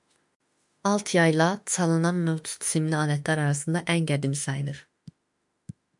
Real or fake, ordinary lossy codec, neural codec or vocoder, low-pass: fake; MP3, 96 kbps; autoencoder, 48 kHz, 32 numbers a frame, DAC-VAE, trained on Japanese speech; 10.8 kHz